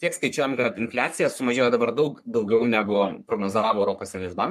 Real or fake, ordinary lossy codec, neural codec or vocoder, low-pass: fake; MP3, 96 kbps; codec, 44.1 kHz, 3.4 kbps, Pupu-Codec; 14.4 kHz